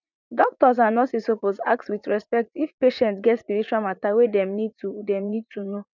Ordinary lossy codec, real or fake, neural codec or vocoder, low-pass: none; fake; vocoder, 44.1 kHz, 80 mel bands, Vocos; 7.2 kHz